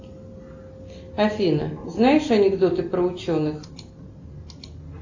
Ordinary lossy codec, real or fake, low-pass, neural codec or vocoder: AAC, 48 kbps; real; 7.2 kHz; none